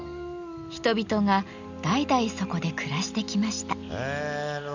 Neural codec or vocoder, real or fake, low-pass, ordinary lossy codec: none; real; 7.2 kHz; none